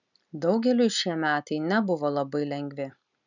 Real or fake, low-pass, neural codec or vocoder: real; 7.2 kHz; none